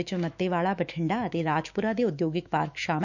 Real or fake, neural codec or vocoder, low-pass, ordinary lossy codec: fake; autoencoder, 48 kHz, 128 numbers a frame, DAC-VAE, trained on Japanese speech; 7.2 kHz; none